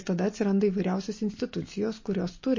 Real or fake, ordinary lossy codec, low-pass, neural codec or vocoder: real; MP3, 32 kbps; 7.2 kHz; none